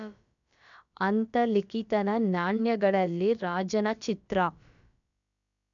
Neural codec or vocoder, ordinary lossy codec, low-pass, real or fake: codec, 16 kHz, about 1 kbps, DyCAST, with the encoder's durations; none; 7.2 kHz; fake